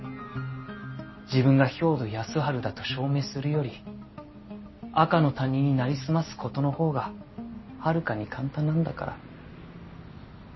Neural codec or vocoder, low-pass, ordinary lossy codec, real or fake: none; 7.2 kHz; MP3, 24 kbps; real